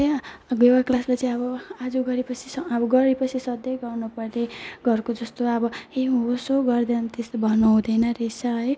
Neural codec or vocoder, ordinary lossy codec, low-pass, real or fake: none; none; none; real